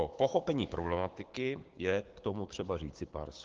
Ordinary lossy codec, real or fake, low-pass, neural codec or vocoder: Opus, 16 kbps; fake; 7.2 kHz; codec, 16 kHz, 6 kbps, DAC